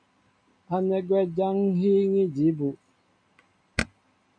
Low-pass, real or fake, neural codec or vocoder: 9.9 kHz; real; none